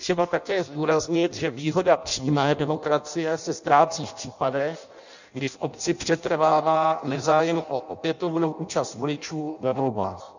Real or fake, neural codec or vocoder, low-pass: fake; codec, 16 kHz in and 24 kHz out, 0.6 kbps, FireRedTTS-2 codec; 7.2 kHz